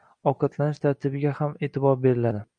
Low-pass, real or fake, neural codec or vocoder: 9.9 kHz; real; none